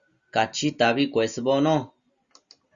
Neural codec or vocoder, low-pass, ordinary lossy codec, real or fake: none; 7.2 kHz; Opus, 64 kbps; real